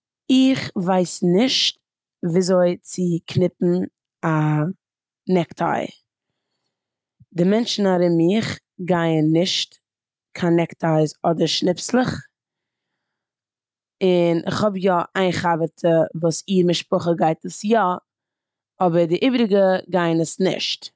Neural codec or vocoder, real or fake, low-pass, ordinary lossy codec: none; real; none; none